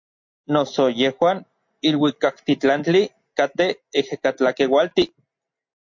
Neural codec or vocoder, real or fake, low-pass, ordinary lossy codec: none; real; 7.2 kHz; MP3, 48 kbps